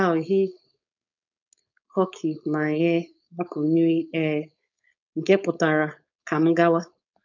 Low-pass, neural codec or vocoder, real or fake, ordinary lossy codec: 7.2 kHz; codec, 16 kHz, 4.8 kbps, FACodec; fake; none